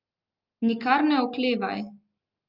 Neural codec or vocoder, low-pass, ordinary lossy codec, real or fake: none; 5.4 kHz; Opus, 32 kbps; real